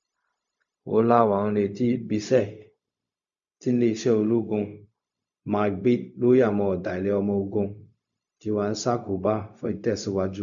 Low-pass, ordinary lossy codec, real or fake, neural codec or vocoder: 7.2 kHz; none; fake; codec, 16 kHz, 0.4 kbps, LongCat-Audio-Codec